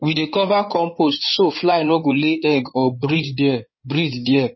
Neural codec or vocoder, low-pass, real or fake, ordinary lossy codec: codec, 16 kHz in and 24 kHz out, 2.2 kbps, FireRedTTS-2 codec; 7.2 kHz; fake; MP3, 24 kbps